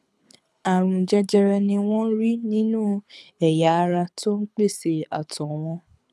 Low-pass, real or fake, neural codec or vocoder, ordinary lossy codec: none; fake; codec, 24 kHz, 6 kbps, HILCodec; none